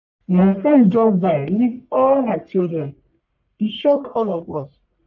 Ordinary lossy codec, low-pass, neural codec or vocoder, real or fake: none; 7.2 kHz; codec, 44.1 kHz, 1.7 kbps, Pupu-Codec; fake